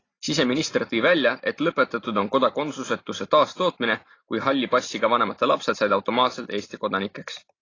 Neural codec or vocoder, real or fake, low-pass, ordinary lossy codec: none; real; 7.2 kHz; AAC, 32 kbps